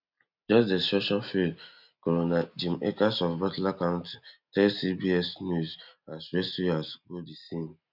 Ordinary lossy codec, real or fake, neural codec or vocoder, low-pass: none; real; none; 5.4 kHz